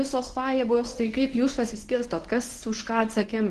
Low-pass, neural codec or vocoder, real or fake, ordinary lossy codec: 10.8 kHz; codec, 24 kHz, 0.9 kbps, WavTokenizer, medium speech release version 1; fake; Opus, 16 kbps